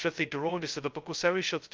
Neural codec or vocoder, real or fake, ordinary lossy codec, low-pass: codec, 16 kHz, 0.2 kbps, FocalCodec; fake; Opus, 32 kbps; 7.2 kHz